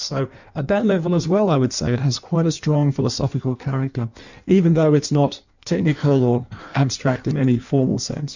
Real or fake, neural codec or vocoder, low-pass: fake; codec, 16 kHz in and 24 kHz out, 1.1 kbps, FireRedTTS-2 codec; 7.2 kHz